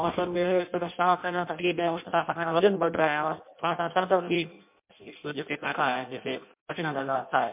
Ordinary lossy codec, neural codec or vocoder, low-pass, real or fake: MP3, 32 kbps; codec, 16 kHz in and 24 kHz out, 0.6 kbps, FireRedTTS-2 codec; 3.6 kHz; fake